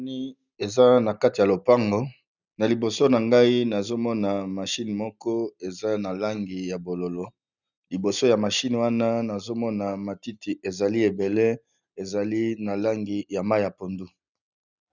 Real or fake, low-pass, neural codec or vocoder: real; 7.2 kHz; none